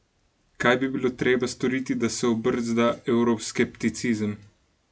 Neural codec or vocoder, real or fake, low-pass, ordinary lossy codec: none; real; none; none